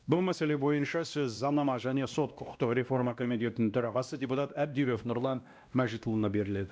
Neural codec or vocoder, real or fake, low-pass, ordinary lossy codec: codec, 16 kHz, 1 kbps, X-Codec, WavLM features, trained on Multilingual LibriSpeech; fake; none; none